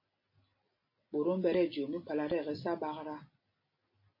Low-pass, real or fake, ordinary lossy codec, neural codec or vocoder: 5.4 kHz; real; MP3, 24 kbps; none